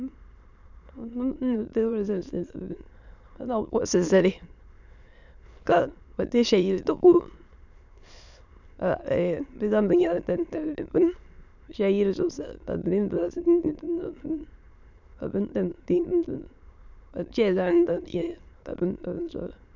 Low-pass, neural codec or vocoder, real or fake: 7.2 kHz; autoencoder, 22.05 kHz, a latent of 192 numbers a frame, VITS, trained on many speakers; fake